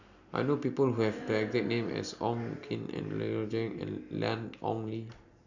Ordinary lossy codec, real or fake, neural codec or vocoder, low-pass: none; real; none; 7.2 kHz